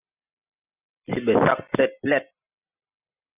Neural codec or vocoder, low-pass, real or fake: none; 3.6 kHz; real